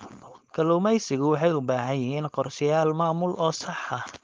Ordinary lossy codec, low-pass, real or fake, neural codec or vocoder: Opus, 32 kbps; 7.2 kHz; fake; codec, 16 kHz, 4.8 kbps, FACodec